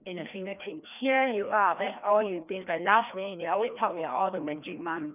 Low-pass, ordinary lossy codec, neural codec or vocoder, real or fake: 3.6 kHz; none; codec, 16 kHz, 1 kbps, FreqCodec, larger model; fake